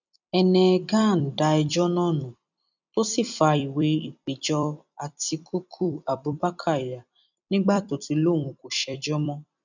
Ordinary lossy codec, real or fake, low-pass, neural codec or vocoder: none; fake; 7.2 kHz; vocoder, 44.1 kHz, 128 mel bands every 256 samples, BigVGAN v2